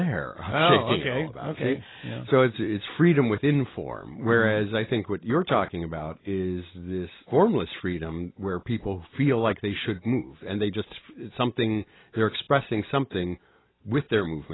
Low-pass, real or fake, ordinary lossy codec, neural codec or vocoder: 7.2 kHz; real; AAC, 16 kbps; none